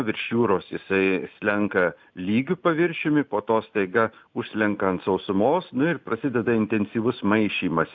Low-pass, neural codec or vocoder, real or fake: 7.2 kHz; none; real